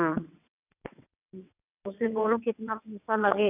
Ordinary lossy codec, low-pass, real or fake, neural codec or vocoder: none; 3.6 kHz; fake; vocoder, 22.05 kHz, 80 mel bands, WaveNeXt